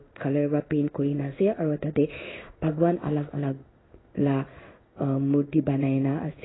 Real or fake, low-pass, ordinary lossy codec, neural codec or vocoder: fake; 7.2 kHz; AAC, 16 kbps; codec, 16 kHz in and 24 kHz out, 1 kbps, XY-Tokenizer